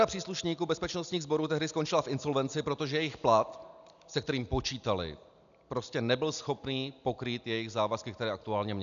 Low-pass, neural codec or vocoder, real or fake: 7.2 kHz; none; real